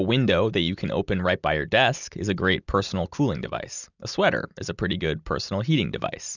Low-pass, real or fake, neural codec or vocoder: 7.2 kHz; real; none